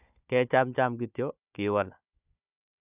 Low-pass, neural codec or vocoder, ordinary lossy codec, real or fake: 3.6 kHz; codec, 16 kHz, 4 kbps, FunCodec, trained on Chinese and English, 50 frames a second; none; fake